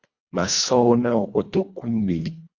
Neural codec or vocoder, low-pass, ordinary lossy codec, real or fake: codec, 24 kHz, 1.5 kbps, HILCodec; 7.2 kHz; Opus, 64 kbps; fake